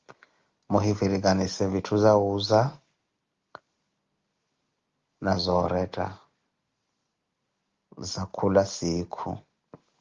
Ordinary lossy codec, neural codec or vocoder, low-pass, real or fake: Opus, 32 kbps; none; 7.2 kHz; real